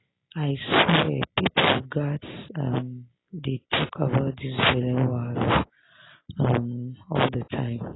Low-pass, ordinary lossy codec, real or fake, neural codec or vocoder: 7.2 kHz; AAC, 16 kbps; real; none